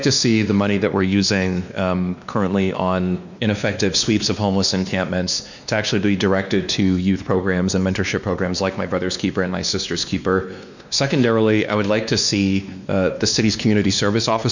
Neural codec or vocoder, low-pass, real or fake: codec, 16 kHz, 2 kbps, X-Codec, WavLM features, trained on Multilingual LibriSpeech; 7.2 kHz; fake